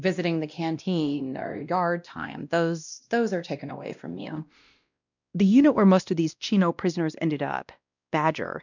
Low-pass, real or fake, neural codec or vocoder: 7.2 kHz; fake; codec, 16 kHz, 1 kbps, X-Codec, WavLM features, trained on Multilingual LibriSpeech